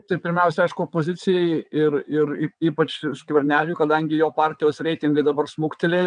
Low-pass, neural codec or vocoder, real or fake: 9.9 kHz; vocoder, 22.05 kHz, 80 mel bands, WaveNeXt; fake